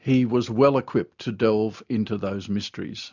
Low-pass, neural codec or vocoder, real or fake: 7.2 kHz; none; real